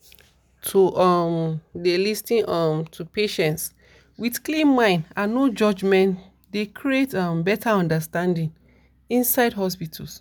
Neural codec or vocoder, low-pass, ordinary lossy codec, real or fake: none; none; none; real